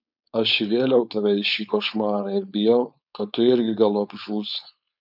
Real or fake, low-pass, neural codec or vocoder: fake; 5.4 kHz; codec, 16 kHz, 4.8 kbps, FACodec